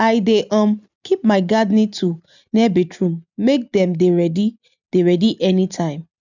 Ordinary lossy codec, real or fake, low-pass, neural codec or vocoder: none; real; 7.2 kHz; none